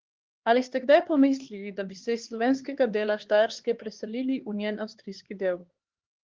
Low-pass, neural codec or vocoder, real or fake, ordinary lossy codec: 7.2 kHz; codec, 16 kHz, 2 kbps, X-Codec, HuBERT features, trained on LibriSpeech; fake; Opus, 16 kbps